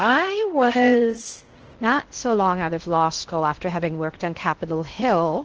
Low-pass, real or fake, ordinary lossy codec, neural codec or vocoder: 7.2 kHz; fake; Opus, 16 kbps; codec, 16 kHz in and 24 kHz out, 0.6 kbps, FocalCodec, streaming, 2048 codes